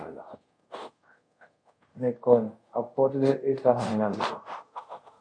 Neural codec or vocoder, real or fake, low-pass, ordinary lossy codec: codec, 24 kHz, 0.5 kbps, DualCodec; fake; 9.9 kHz; MP3, 48 kbps